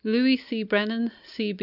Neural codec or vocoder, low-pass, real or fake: none; 5.4 kHz; real